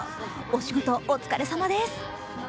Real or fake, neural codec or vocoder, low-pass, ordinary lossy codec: real; none; none; none